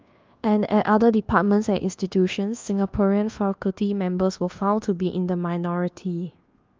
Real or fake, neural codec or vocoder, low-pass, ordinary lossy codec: fake; codec, 24 kHz, 1.2 kbps, DualCodec; 7.2 kHz; Opus, 32 kbps